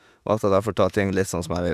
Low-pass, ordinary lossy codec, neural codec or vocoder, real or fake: 14.4 kHz; none; autoencoder, 48 kHz, 32 numbers a frame, DAC-VAE, trained on Japanese speech; fake